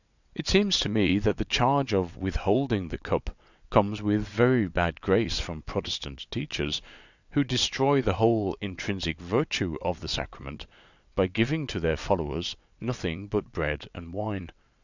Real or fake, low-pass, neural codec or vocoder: fake; 7.2 kHz; vocoder, 22.05 kHz, 80 mel bands, WaveNeXt